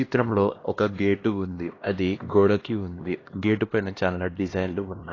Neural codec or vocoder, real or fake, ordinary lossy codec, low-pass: codec, 16 kHz, 1 kbps, X-Codec, HuBERT features, trained on LibriSpeech; fake; AAC, 32 kbps; 7.2 kHz